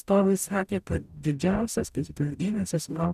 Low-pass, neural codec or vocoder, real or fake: 14.4 kHz; codec, 44.1 kHz, 0.9 kbps, DAC; fake